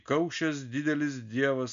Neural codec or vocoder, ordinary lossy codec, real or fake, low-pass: none; MP3, 64 kbps; real; 7.2 kHz